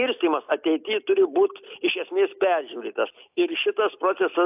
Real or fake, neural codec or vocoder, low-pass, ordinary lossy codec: real; none; 3.6 kHz; AAC, 32 kbps